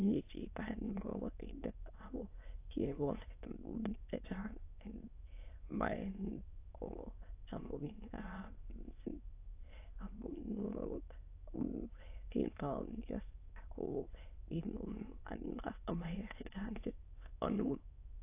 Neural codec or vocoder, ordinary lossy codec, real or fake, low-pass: autoencoder, 22.05 kHz, a latent of 192 numbers a frame, VITS, trained on many speakers; AAC, 24 kbps; fake; 3.6 kHz